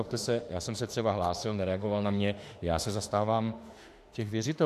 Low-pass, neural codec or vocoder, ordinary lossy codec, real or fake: 14.4 kHz; autoencoder, 48 kHz, 32 numbers a frame, DAC-VAE, trained on Japanese speech; AAC, 64 kbps; fake